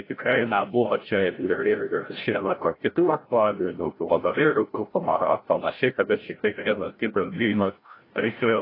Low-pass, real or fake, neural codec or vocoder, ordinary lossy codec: 5.4 kHz; fake; codec, 16 kHz, 0.5 kbps, FreqCodec, larger model; AAC, 24 kbps